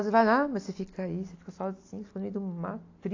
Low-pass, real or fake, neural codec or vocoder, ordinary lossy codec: 7.2 kHz; real; none; AAC, 48 kbps